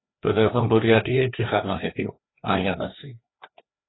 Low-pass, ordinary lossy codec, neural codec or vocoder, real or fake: 7.2 kHz; AAC, 16 kbps; codec, 16 kHz, 2 kbps, FreqCodec, larger model; fake